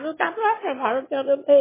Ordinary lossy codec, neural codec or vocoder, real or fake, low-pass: MP3, 16 kbps; autoencoder, 22.05 kHz, a latent of 192 numbers a frame, VITS, trained on one speaker; fake; 3.6 kHz